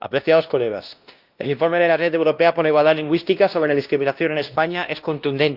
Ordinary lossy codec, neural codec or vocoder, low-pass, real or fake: Opus, 32 kbps; codec, 16 kHz, 1 kbps, X-Codec, WavLM features, trained on Multilingual LibriSpeech; 5.4 kHz; fake